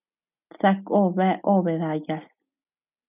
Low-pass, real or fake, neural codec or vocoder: 3.6 kHz; real; none